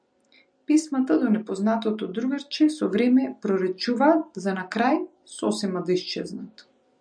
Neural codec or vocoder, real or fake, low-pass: none; real; 9.9 kHz